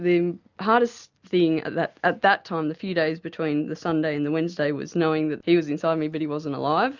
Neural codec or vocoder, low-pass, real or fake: none; 7.2 kHz; real